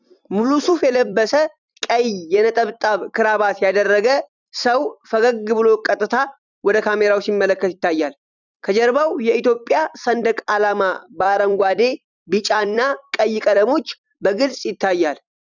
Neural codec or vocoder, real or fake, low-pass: vocoder, 44.1 kHz, 128 mel bands every 256 samples, BigVGAN v2; fake; 7.2 kHz